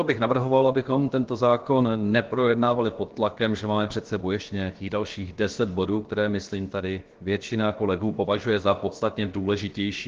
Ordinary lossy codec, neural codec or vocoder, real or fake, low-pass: Opus, 16 kbps; codec, 16 kHz, about 1 kbps, DyCAST, with the encoder's durations; fake; 7.2 kHz